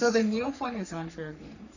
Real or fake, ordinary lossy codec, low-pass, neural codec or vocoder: fake; none; 7.2 kHz; codec, 44.1 kHz, 3.4 kbps, Pupu-Codec